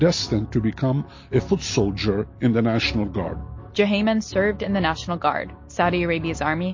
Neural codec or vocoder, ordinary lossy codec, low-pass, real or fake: none; MP3, 32 kbps; 7.2 kHz; real